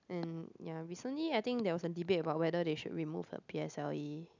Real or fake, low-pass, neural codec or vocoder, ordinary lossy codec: real; 7.2 kHz; none; none